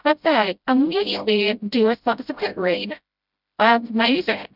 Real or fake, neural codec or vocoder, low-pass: fake; codec, 16 kHz, 0.5 kbps, FreqCodec, smaller model; 5.4 kHz